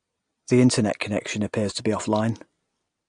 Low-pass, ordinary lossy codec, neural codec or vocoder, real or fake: 9.9 kHz; AAC, 48 kbps; none; real